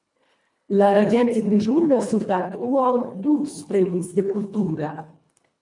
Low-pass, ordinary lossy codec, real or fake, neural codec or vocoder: 10.8 kHz; MP3, 64 kbps; fake; codec, 24 kHz, 1.5 kbps, HILCodec